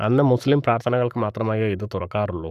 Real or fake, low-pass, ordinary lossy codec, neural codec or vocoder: fake; 14.4 kHz; none; codec, 44.1 kHz, 7.8 kbps, Pupu-Codec